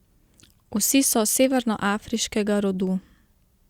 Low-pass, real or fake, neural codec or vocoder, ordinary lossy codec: 19.8 kHz; real; none; Opus, 64 kbps